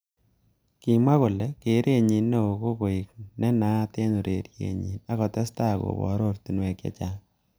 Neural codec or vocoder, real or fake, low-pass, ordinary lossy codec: none; real; none; none